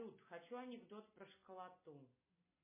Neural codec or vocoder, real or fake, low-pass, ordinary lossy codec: none; real; 3.6 kHz; MP3, 16 kbps